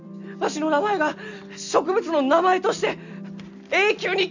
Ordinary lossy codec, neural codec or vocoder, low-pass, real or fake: none; none; 7.2 kHz; real